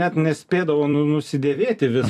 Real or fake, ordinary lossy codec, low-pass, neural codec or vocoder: fake; AAC, 96 kbps; 14.4 kHz; vocoder, 44.1 kHz, 128 mel bands every 256 samples, BigVGAN v2